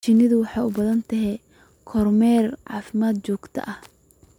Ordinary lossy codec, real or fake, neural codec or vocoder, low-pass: MP3, 96 kbps; real; none; 19.8 kHz